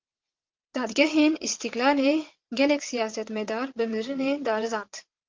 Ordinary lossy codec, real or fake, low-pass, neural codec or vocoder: Opus, 24 kbps; fake; 7.2 kHz; vocoder, 22.05 kHz, 80 mel bands, WaveNeXt